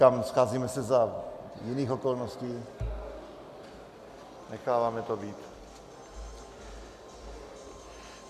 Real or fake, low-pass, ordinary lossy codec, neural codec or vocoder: real; 14.4 kHz; MP3, 96 kbps; none